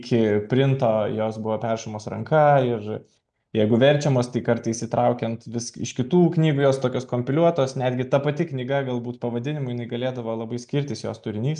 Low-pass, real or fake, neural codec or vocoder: 9.9 kHz; real; none